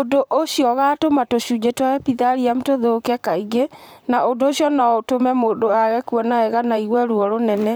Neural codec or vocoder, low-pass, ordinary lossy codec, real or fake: vocoder, 44.1 kHz, 128 mel bands, Pupu-Vocoder; none; none; fake